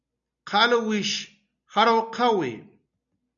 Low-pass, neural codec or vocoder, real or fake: 7.2 kHz; none; real